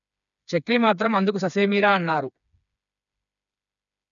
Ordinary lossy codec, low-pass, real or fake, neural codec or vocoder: none; 7.2 kHz; fake; codec, 16 kHz, 4 kbps, FreqCodec, smaller model